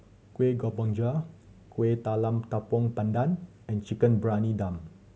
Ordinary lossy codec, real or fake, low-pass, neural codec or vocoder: none; real; none; none